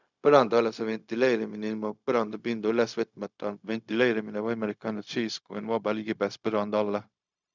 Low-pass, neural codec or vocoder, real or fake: 7.2 kHz; codec, 16 kHz, 0.4 kbps, LongCat-Audio-Codec; fake